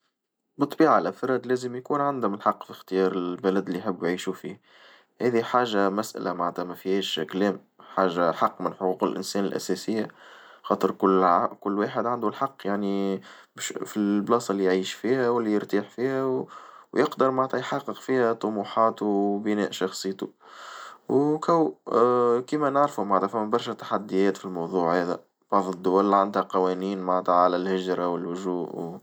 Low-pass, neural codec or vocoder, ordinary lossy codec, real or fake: none; none; none; real